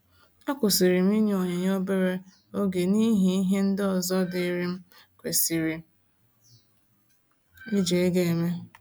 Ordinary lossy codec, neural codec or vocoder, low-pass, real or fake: none; none; none; real